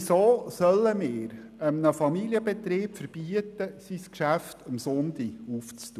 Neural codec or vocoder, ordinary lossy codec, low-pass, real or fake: none; none; 14.4 kHz; real